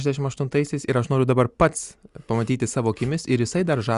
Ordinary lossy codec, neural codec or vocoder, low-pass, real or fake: Opus, 64 kbps; none; 10.8 kHz; real